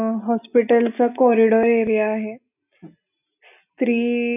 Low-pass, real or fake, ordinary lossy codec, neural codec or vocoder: 3.6 kHz; real; none; none